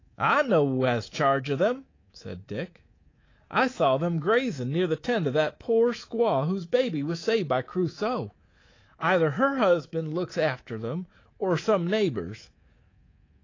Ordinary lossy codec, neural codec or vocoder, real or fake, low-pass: AAC, 32 kbps; codec, 24 kHz, 3.1 kbps, DualCodec; fake; 7.2 kHz